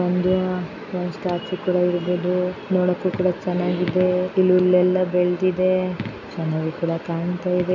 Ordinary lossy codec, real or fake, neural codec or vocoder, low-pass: none; real; none; 7.2 kHz